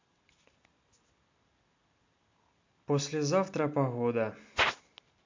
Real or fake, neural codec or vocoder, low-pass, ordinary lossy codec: real; none; 7.2 kHz; MP3, 48 kbps